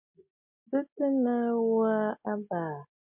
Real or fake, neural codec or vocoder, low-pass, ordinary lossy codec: real; none; 3.6 kHz; MP3, 32 kbps